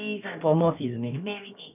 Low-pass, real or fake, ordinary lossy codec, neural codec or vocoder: 3.6 kHz; fake; none; codec, 16 kHz, about 1 kbps, DyCAST, with the encoder's durations